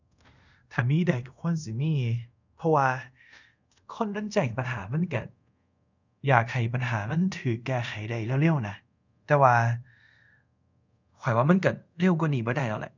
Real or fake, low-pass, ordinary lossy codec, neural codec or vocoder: fake; 7.2 kHz; none; codec, 24 kHz, 0.5 kbps, DualCodec